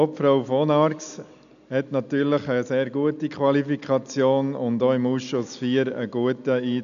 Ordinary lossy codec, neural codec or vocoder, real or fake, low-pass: none; none; real; 7.2 kHz